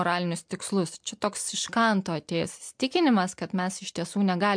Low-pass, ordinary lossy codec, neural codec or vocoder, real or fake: 9.9 kHz; MP3, 64 kbps; none; real